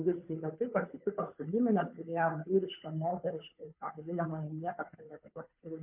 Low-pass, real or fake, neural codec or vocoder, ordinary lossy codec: 3.6 kHz; fake; codec, 16 kHz, 2 kbps, FunCodec, trained on Chinese and English, 25 frames a second; MP3, 32 kbps